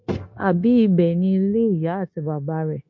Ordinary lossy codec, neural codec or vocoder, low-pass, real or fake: MP3, 48 kbps; codec, 16 kHz, 0.9 kbps, LongCat-Audio-Codec; 7.2 kHz; fake